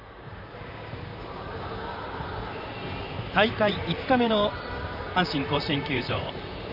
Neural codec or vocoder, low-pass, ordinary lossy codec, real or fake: vocoder, 44.1 kHz, 128 mel bands, Pupu-Vocoder; 5.4 kHz; none; fake